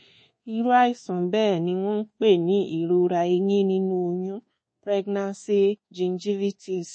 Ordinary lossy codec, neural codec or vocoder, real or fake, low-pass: MP3, 32 kbps; codec, 24 kHz, 1.2 kbps, DualCodec; fake; 9.9 kHz